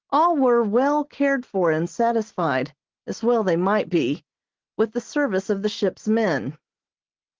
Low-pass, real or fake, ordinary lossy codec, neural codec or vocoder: 7.2 kHz; real; Opus, 16 kbps; none